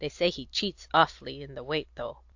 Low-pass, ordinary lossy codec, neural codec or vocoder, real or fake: 7.2 kHz; AAC, 48 kbps; none; real